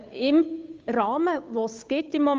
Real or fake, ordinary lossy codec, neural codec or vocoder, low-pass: fake; Opus, 32 kbps; codec, 16 kHz, 8 kbps, FunCodec, trained on Chinese and English, 25 frames a second; 7.2 kHz